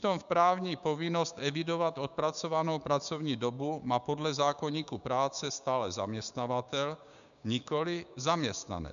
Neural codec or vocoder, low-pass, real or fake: codec, 16 kHz, 6 kbps, DAC; 7.2 kHz; fake